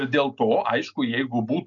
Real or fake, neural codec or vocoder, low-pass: real; none; 7.2 kHz